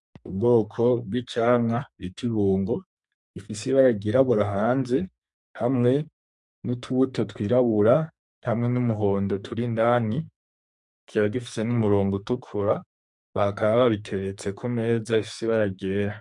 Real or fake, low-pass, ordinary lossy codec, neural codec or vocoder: fake; 10.8 kHz; MP3, 64 kbps; codec, 44.1 kHz, 2.6 kbps, SNAC